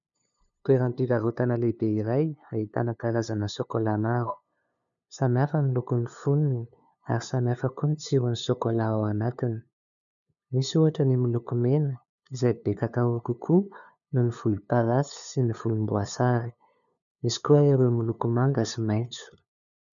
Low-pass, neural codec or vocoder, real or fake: 7.2 kHz; codec, 16 kHz, 2 kbps, FunCodec, trained on LibriTTS, 25 frames a second; fake